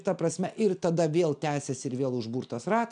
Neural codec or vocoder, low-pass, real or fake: none; 9.9 kHz; real